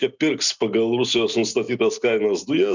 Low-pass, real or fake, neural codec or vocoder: 7.2 kHz; real; none